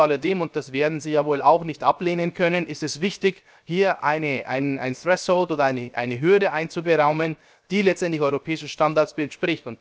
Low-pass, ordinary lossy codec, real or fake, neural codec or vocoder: none; none; fake; codec, 16 kHz, 0.7 kbps, FocalCodec